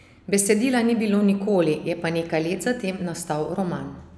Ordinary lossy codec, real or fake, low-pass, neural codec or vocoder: none; real; none; none